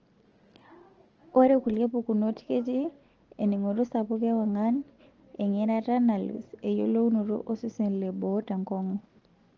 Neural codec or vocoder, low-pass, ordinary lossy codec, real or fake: none; 7.2 kHz; Opus, 16 kbps; real